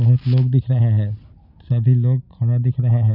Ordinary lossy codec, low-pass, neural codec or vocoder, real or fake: none; 5.4 kHz; none; real